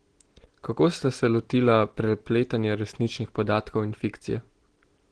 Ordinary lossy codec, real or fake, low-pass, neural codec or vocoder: Opus, 16 kbps; real; 9.9 kHz; none